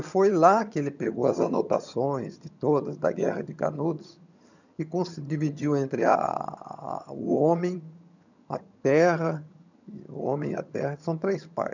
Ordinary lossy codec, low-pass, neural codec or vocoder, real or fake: none; 7.2 kHz; vocoder, 22.05 kHz, 80 mel bands, HiFi-GAN; fake